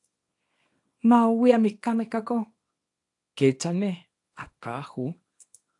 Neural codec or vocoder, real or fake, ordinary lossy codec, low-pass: codec, 24 kHz, 0.9 kbps, WavTokenizer, small release; fake; AAC, 48 kbps; 10.8 kHz